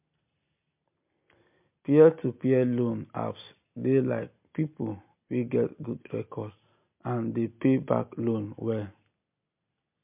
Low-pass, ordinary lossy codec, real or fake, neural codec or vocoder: 3.6 kHz; MP3, 32 kbps; real; none